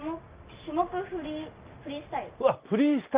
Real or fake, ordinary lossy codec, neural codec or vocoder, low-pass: real; Opus, 32 kbps; none; 3.6 kHz